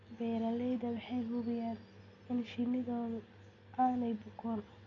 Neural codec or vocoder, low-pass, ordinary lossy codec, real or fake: none; 7.2 kHz; none; real